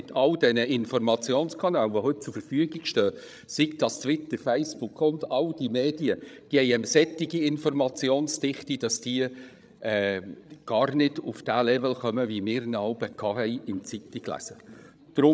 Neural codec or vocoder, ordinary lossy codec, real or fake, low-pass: codec, 16 kHz, 16 kbps, FreqCodec, larger model; none; fake; none